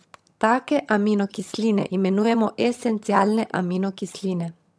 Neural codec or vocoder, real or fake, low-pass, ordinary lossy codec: vocoder, 22.05 kHz, 80 mel bands, HiFi-GAN; fake; none; none